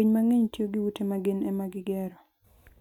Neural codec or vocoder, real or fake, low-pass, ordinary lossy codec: none; real; 19.8 kHz; none